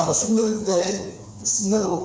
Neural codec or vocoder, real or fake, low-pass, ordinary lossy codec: codec, 16 kHz, 2 kbps, FreqCodec, larger model; fake; none; none